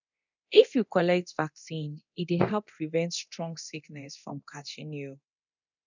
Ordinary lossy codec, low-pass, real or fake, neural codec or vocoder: none; 7.2 kHz; fake; codec, 24 kHz, 0.9 kbps, DualCodec